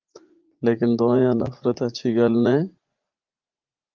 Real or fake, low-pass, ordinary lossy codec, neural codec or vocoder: fake; 7.2 kHz; Opus, 32 kbps; vocoder, 44.1 kHz, 80 mel bands, Vocos